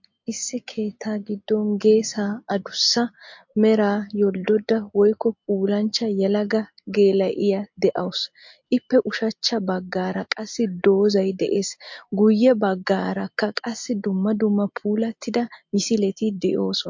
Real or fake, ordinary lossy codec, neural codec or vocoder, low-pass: real; MP3, 48 kbps; none; 7.2 kHz